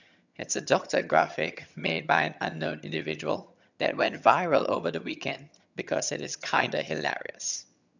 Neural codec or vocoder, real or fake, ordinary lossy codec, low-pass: vocoder, 22.05 kHz, 80 mel bands, HiFi-GAN; fake; none; 7.2 kHz